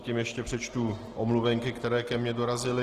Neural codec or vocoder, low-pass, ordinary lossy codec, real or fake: none; 14.4 kHz; Opus, 16 kbps; real